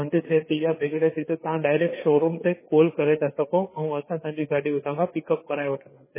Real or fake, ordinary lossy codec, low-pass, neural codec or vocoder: fake; MP3, 16 kbps; 3.6 kHz; codec, 16 kHz, 4 kbps, FreqCodec, larger model